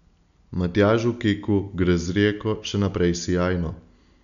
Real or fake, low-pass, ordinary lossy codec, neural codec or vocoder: real; 7.2 kHz; none; none